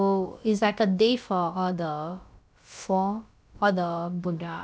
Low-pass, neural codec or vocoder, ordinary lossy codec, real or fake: none; codec, 16 kHz, about 1 kbps, DyCAST, with the encoder's durations; none; fake